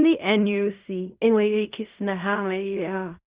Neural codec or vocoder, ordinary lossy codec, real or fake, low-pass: codec, 16 kHz in and 24 kHz out, 0.4 kbps, LongCat-Audio-Codec, fine tuned four codebook decoder; Opus, 64 kbps; fake; 3.6 kHz